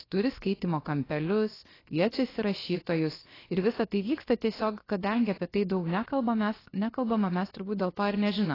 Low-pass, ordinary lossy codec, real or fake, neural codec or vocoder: 5.4 kHz; AAC, 24 kbps; fake; codec, 16 kHz, about 1 kbps, DyCAST, with the encoder's durations